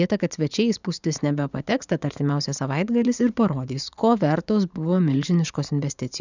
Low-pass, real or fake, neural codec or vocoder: 7.2 kHz; fake; vocoder, 44.1 kHz, 80 mel bands, Vocos